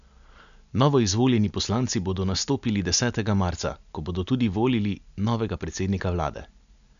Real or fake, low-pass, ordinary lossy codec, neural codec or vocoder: real; 7.2 kHz; none; none